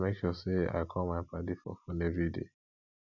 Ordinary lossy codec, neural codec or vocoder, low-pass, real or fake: none; none; 7.2 kHz; real